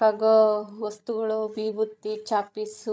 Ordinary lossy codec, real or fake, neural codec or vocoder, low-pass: none; fake; codec, 16 kHz, 16 kbps, FreqCodec, larger model; none